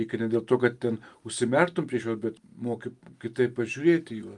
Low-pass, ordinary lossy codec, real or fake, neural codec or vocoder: 10.8 kHz; Opus, 32 kbps; real; none